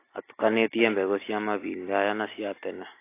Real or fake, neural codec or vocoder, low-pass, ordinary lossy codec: real; none; 3.6 kHz; AAC, 24 kbps